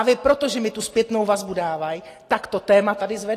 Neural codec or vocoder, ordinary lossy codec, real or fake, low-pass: none; AAC, 48 kbps; real; 14.4 kHz